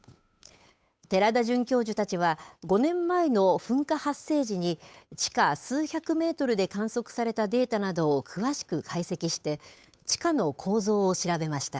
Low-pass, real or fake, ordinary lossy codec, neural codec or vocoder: none; fake; none; codec, 16 kHz, 8 kbps, FunCodec, trained on Chinese and English, 25 frames a second